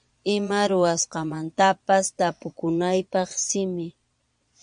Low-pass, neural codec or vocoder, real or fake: 9.9 kHz; vocoder, 22.05 kHz, 80 mel bands, Vocos; fake